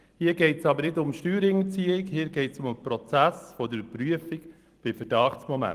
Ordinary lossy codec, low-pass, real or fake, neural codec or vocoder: Opus, 24 kbps; 14.4 kHz; fake; vocoder, 44.1 kHz, 128 mel bands every 256 samples, BigVGAN v2